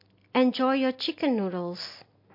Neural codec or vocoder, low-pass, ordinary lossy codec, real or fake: none; 5.4 kHz; MP3, 32 kbps; real